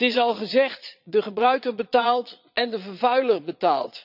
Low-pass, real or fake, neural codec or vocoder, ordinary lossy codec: 5.4 kHz; fake; vocoder, 22.05 kHz, 80 mel bands, Vocos; none